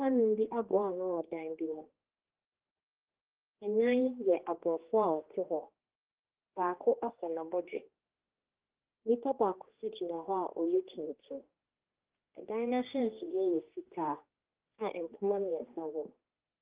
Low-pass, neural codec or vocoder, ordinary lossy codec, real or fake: 3.6 kHz; codec, 16 kHz, 2 kbps, X-Codec, HuBERT features, trained on general audio; Opus, 16 kbps; fake